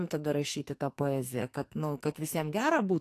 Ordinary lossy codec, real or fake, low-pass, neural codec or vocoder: AAC, 64 kbps; fake; 14.4 kHz; codec, 44.1 kHz, 2.6 kbps, SNAC